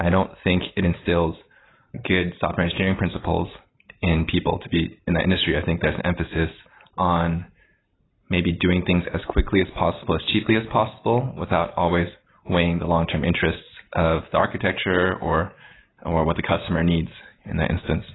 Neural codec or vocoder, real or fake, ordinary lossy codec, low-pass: none; real; AAC, 16 kbps; 7.2 kHz